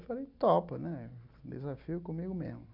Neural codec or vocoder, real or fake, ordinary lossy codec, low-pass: none; real; none; 5.4 kHz